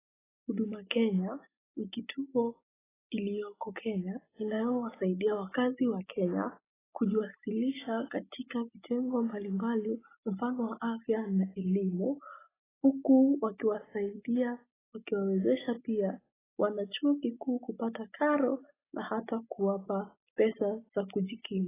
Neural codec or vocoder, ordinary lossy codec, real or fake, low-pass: none; AAC, 16 kbps; real; 3.6 kHz